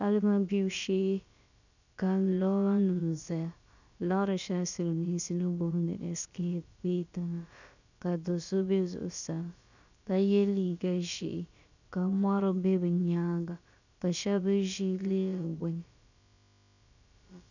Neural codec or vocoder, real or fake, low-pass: codec, 16 kHz, about 1 kbps, DyCAST, with the encoder's durations; fake; 7.2 kHz